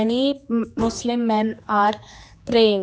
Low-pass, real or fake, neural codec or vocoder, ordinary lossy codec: none; fake; codec, 16 kHz, 2 kbps, X-Codec, HuBERT features, trained on general audio; none